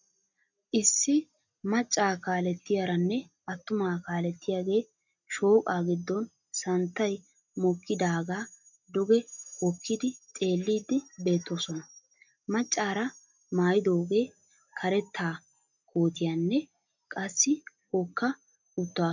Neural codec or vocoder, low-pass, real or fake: none; 7.2 kHz; real